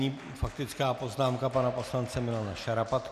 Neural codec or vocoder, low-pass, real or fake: none; 14.4 kHz; real